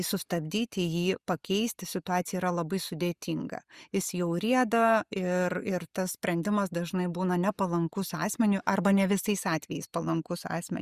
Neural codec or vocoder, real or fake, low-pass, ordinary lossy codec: none; real; 14.4 kHz; Opus, 64 kbps